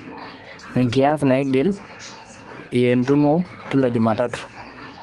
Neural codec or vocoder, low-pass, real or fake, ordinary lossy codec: codec, 24 kHz, 1 kbps, SNAC; 10.8 kHz; fake; Opus, 24 kbps